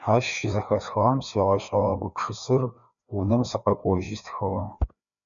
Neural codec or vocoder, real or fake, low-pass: codec, 16 kHz, 2 kbps, FreqCodec, larger model; fake; 7.2 kHz